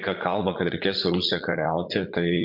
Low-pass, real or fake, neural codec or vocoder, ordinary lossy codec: 5.4 kHz; fake; vocoder, 44.1 kHz, 80 mel bands, Vocos; MP3, 48 kbps